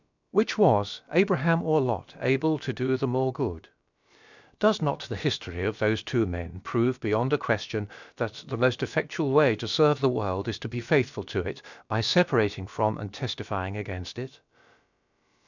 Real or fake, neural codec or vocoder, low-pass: fake; codec, 16 kHz, about 1 kbps, DyCAST, with the encoder's durations; 7.2 kHz